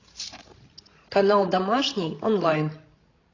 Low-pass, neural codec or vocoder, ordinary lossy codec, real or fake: 7.2 kHz; codec, 16 kHz, 16 kbps, FreqCodec, larger model; AAC, 32 kbps; fake